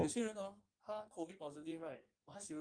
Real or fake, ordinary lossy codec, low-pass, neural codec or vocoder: fake; Opus, 32 kbps; 9.9 kHz; codec, 16 kHz in and 24 kHz out, 1.1 kbps, FireRedTTS-2 codec